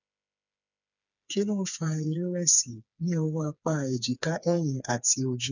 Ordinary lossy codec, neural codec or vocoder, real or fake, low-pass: none; codec, 16 kHz, 4 kbps, FreqCodec, smaller model; fake; 7.2 kHz